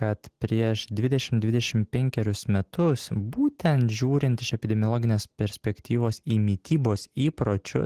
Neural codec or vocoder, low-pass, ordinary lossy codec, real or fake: none; 14.4 kHz; Opus, 16 kbps; real